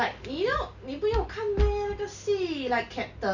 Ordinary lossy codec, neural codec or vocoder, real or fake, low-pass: none; none; real; 7.2 kHz